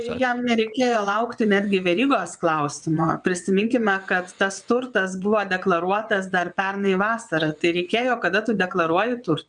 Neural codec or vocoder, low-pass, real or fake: vocoder, 22.05 kHz, 80 mel bands, WaveNeXt; 9.9 kHz; fake